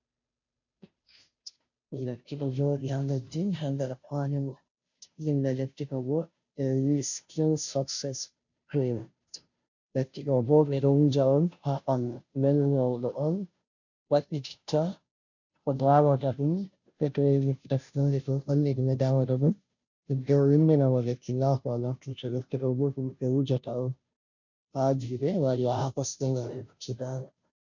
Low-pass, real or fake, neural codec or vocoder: 7.2 kHz; fake; codec, 16 kHz, 0.5 kbps, FunCodec, trained on Chinese and English, 25 frames a second